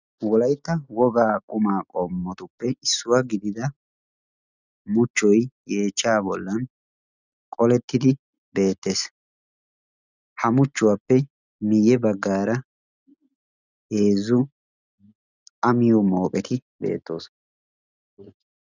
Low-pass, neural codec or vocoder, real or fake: 7.2 kHz; none; real